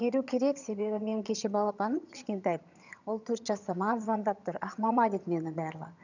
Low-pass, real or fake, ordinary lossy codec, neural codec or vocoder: 7.2 kHz; fake; none; vocoder, 22.05 kHz, 80 mel bands, HiFi-GAN